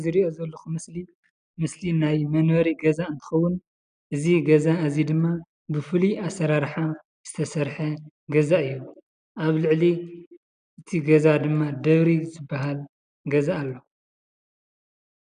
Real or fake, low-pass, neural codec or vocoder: real; 9.9 kHz; none